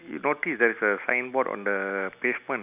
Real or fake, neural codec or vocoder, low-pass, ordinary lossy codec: real; none; 3.6 kHz; none